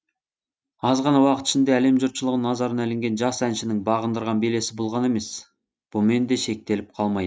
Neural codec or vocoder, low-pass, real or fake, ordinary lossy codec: none; none; real; none